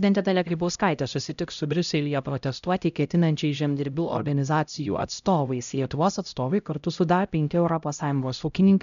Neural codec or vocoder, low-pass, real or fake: codec, 16 kHz, 0.5 kbps, X-Codec, HuBERT features, trained on LibriSpeech; 7.2 kHz; fake